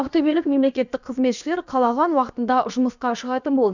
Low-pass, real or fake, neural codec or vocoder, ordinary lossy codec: 7.2 kHz; fake; codec, 16 kHz, about 1 kbps, DyCAST, with the encoder's durations; none